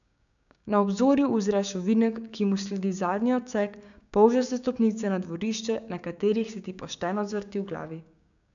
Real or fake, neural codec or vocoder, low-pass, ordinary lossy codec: fake; codec, 16 kHz, 6 kbps, DAC; 7.2 kHz; none